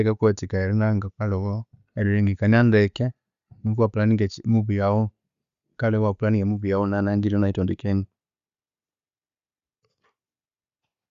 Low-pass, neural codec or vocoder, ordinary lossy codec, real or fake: 7.2 kHz; none; Opus, 64 kbps; real